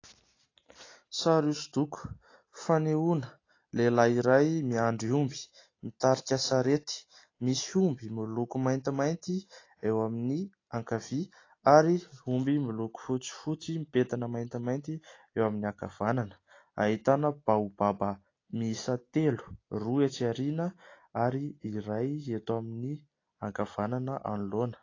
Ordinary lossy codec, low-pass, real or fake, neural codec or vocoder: AAC, 32 kbps; 7.2 kHz; real; none